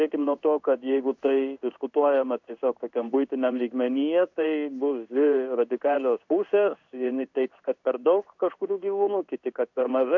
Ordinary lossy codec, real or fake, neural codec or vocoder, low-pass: MP3, 64 kbps; fake; codec, 16 kHz in and 24 kHz out, 1 kbps, XY-Tokenizer; 7.2 kHz